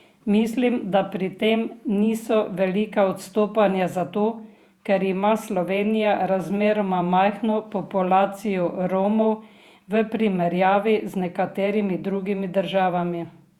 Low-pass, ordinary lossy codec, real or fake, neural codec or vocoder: 19.8 kHz; Opus, 64 kbps; fake; vocoder, 48 kHz, 128 mel bands, Vocos